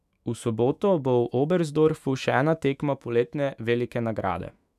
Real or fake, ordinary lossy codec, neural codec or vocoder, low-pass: fake; none; autoencoder, 48 kHz, 128 numbers a frame, DAC-VAE, trained on Japanese speech; 14.4 kHz